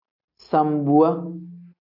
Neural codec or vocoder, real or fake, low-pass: none; real; 5.4 kHz